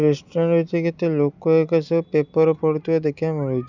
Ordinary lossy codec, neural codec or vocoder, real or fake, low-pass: none; none; real; 7.2 kHz